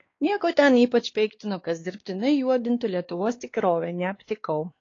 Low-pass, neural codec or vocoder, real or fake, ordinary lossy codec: 7.2 kHz; codec, 16 kHz, 2 kbps, X-Codec, WavLM features, trained on Multilingual LibriSpeech; fake; AAC, 32 kbps